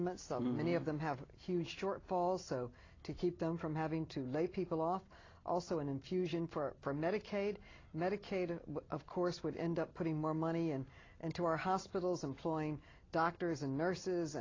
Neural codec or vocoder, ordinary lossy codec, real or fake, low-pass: none; AAC, 32 kbps; real; 7.2 kHz